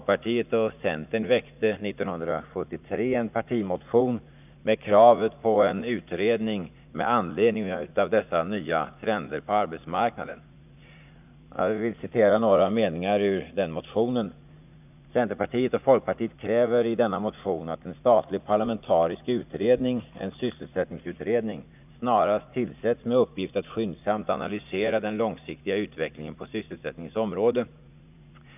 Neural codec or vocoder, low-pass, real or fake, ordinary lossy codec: vocoder, 44.1 kHz, 80 mel bands, Vocos; 3.6 kHz; fake; none